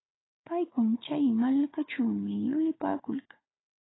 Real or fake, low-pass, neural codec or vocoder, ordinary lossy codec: fake; 7.2 kHz; codec, 16 kHz, 4 kbps, FunCodec, trained on Chinese and English, 50 frames a second; AAC, 16 kbps